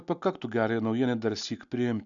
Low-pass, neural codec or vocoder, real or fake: 7.2 kHz; none; real